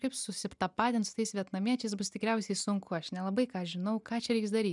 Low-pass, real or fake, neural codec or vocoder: 10.8 kHz; real; none